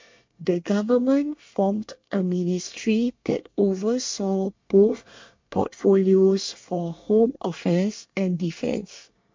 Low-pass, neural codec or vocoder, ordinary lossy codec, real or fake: 7.2 kHz; codec, 24 kHz, 1 kbps, SNAC; MP3, 48 kbps; fake